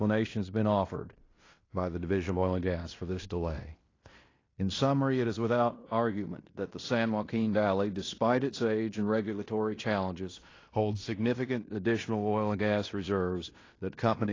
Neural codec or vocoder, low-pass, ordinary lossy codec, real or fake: codec, 16 kHz in and 24 kHz out, 0.9 kbps, LongCat-Audio-Codec, fine tuned four codebook decoder; 7.2 kHz; AAC, 32 kbps; fake